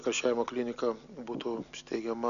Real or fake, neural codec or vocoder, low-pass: real; none; 7.2 kHz